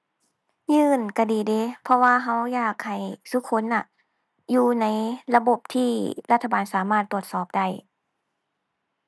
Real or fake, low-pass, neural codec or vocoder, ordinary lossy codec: real; none; none; none